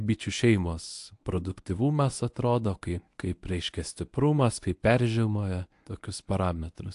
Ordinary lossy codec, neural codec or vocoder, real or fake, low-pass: AAC, 64 kbps; codec, 24 kHz, 0.9 kbps, WavTokenizer, medium speech release version 1; fake; 10.8 kHz